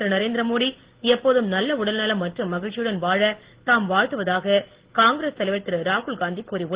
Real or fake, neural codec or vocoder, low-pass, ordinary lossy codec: real; none; 3.6 kHz; Opus, 16 kbps